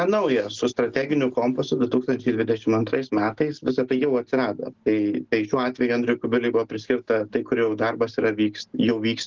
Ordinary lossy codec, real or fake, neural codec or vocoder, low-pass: Opus, 16 kbps; real; none; 7.2 kHz